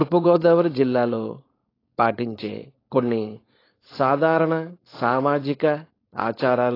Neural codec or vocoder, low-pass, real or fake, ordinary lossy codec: codec, 16 kHz, 4.8 kbps, FACodec; 5.4 kHz; fake; AAC, 24 kbps